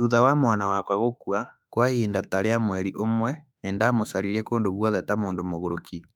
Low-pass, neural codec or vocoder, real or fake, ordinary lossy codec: 19.8 kHz; autoencoder, 48 kHz, 32 numbers a frame, DAC-VAE, trained on Japanese speech; fake; none